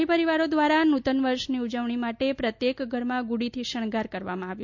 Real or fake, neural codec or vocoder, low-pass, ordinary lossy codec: real; none; 7.2 kHz; MP3, 48 kbps